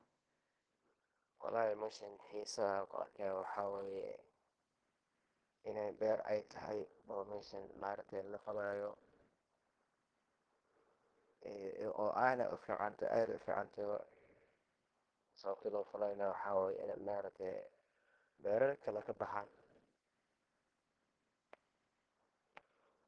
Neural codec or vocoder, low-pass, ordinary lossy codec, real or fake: codec, 16 kHz in and 24 kHz out, 0.9 kbps, LongCat-Audio-Codec, fine tuned four codebook decoder; 9.9 kHz; Opus, 16 kbps; fake